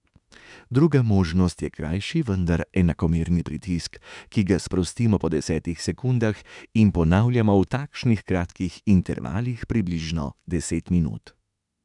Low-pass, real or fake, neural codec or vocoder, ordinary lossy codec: 10.8 kHz; fake; autoencoder, 48 kHz, 32 numbers a frame, DAC-VAE, trained on Japanese speech; none